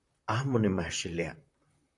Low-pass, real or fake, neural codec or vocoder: 10.8 kHz; fake; vocoder, 44.1 kHz, 128 mel bands, Pupu-Vocoder